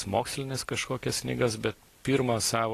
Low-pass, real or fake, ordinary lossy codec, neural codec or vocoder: 14.4 kHz; real; AAC, 48 kbps; none